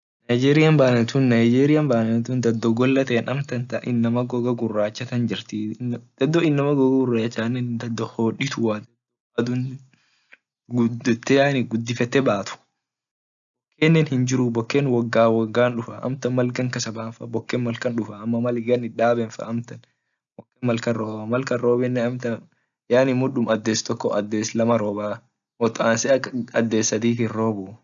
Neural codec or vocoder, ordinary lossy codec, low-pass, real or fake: none; none; 7.2 kHz; real